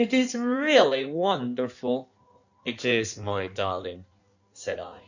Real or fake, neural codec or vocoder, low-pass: fake; codec, 16 kHz in and 24 kHz out, 1.1 kbps, FireRedTTS-2 codec; 7.2 kHz